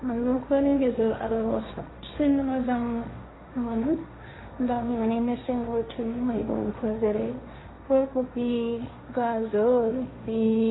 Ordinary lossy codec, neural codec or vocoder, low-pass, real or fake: AAC, 16 kbps; codec, 16 kHz, 1.1 kbps, Voila-Tokenizer; 7.2 kHz; fake